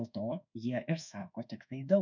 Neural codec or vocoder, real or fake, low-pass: codec, 24 kHz, 1.2 kbps, DualCodec; fake; 7.2 kHz